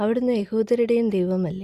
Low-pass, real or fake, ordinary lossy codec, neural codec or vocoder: 14.4 kHz; real; Opus, 64 kbps; none